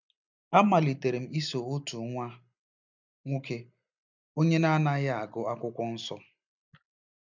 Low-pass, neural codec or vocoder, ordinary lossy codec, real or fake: 7.2 kHz; none; none; real